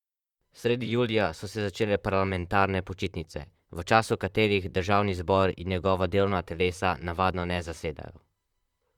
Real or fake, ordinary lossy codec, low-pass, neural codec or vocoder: fake; Opus, 64 kbps; 19.8 kHz; vocoder, 44.1 kHz, 128 mel bands, Pupu-Vocoder